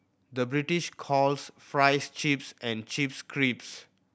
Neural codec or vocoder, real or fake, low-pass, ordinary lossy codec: none; real; none; none